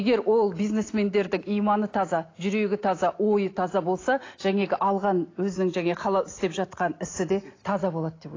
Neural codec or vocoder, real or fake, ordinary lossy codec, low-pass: none; real; AAC, 32 kbps; 7.2 kHz